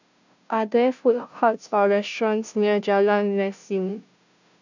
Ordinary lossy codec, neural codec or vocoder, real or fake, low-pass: none; codec, 16 kHz, 0.5 kbps, FunCodec, trained on Chinese and English, 25 frames a second; fake; 7.2 kHz